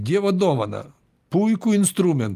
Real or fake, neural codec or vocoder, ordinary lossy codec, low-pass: real; none; Opus, 32 kbps; 14.4 kHz